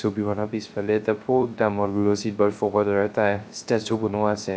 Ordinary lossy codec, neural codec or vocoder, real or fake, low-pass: none; codec, 16 kHz, 0.3 kbps, FocalCodec; fake; none